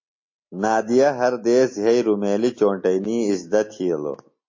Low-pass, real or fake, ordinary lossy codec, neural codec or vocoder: 7.2 kHz; real; MP3, 32 kbps; none